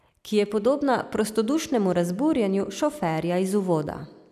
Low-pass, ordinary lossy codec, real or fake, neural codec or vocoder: 14.4 kHz; none; real; none